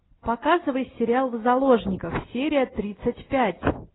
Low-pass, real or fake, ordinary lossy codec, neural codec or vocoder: 7.2 kHz; real; AAC, 16 kbps; none